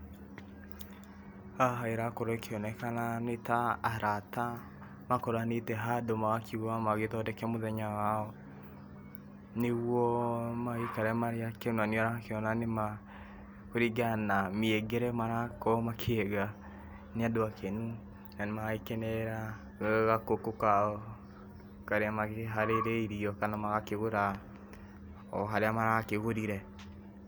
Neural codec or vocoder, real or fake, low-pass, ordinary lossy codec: none; real; none; none